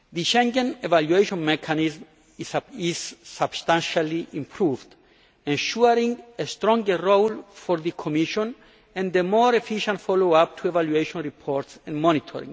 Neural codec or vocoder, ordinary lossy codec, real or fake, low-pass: none; none; real; none